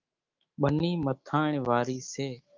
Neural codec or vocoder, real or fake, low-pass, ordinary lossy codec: autoencoder, 48 kHz, 128 numbers a frame, DAC-VAE, trained on Japanese speech; fake; 7.2 kHz; Opus, 24 kbps